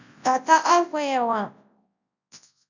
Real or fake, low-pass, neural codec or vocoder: fake; 7.2 kHz; codec, 24 kHz, 0.9 kbps, WavTokenizer, large speech release